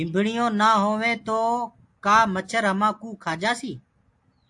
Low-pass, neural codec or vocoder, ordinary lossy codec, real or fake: 10.8 kHz; none; AAC, 64 kbps; real